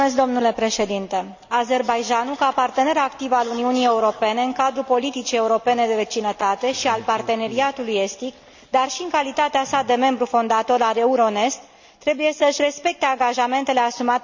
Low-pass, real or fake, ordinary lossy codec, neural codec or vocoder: 7.2 kHz; real; none; none